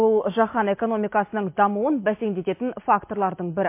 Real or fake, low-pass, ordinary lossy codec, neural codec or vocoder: real; 3.6 kHz; MP3, 24 kbps; none